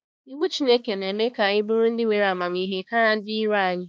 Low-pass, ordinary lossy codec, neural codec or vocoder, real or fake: none; none; codec, 16 kHz, 2 kbps, X-Codec, HuBERT features, trained on balanced general audio; fake